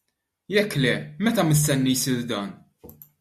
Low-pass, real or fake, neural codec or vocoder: 14.4 kHz; real; none